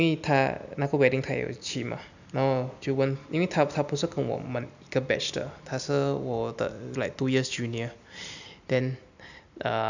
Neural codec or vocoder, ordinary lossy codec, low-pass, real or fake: none; none; 7.2 kHz; real